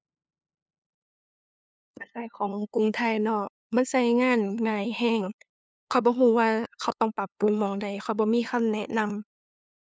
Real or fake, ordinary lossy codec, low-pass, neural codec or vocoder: fake; none; none; codec, 16 kHz, 2 kbps, FunCodec, trained on LibriTTS, 25 frames a second